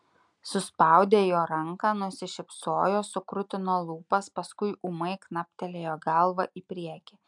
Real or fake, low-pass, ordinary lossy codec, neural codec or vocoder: real; 10.8 kHz; MP3, 96 kbps; none